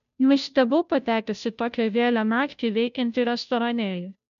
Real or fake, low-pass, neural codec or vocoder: fake; 7.2 kHz; codec, 16 kHz, 0.5 kbps, FunCodec, trained on Chinese and English, 25 frames a second